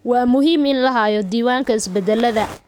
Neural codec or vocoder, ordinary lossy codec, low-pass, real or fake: codec, 44.1 kHz, 7.8 kbps, DAC; none; 19.8 kHz; fake